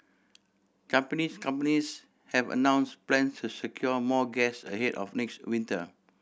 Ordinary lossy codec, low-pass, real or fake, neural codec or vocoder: none; none; real; none